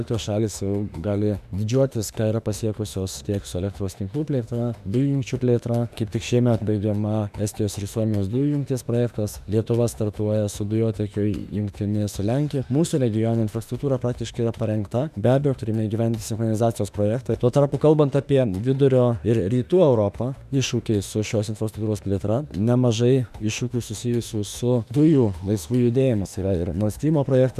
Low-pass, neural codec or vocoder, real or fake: 14.4 kHz; autoencoder, 48 kHz, 32 numbers a frame, DAC-VAE, trained on Japanese speech; fake